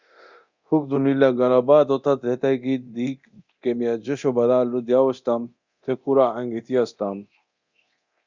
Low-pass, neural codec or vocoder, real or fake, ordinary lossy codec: 7.2 kHz; codec, 24 kHz, 0.9 kbps, DualCodec; fake; Opus, 64 kbps